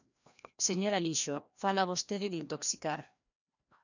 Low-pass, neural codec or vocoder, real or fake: 7.2 kHz; codec, 16 kHz, 1 kbps, FreqCodec, larger model; fake